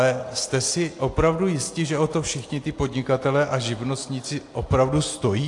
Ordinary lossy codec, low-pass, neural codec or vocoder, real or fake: AAC, 48 kbps; 10.8 kHz; none; real